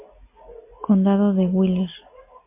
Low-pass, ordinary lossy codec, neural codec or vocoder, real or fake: 3.6 kHz; MP3, 24 kbps; none; real